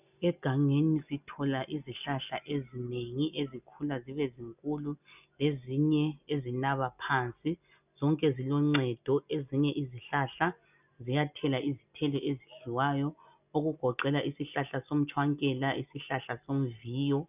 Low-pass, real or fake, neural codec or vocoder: 3.6 kHz; real; none